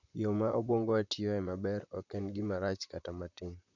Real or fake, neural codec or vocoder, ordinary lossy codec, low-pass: fake; vocoder, 44.1 kHz, 128 mel bands, Pupu-Vocoder; none; 7.2 kHz